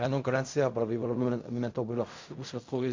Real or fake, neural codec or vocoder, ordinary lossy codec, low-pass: fake; codec, 16 kHz in and 24 kHz out, 0.4 kbps, LongCat-Audio-Codec, fine tuned four codebook decoder; MP3, 48 kbps; 7.2 kHz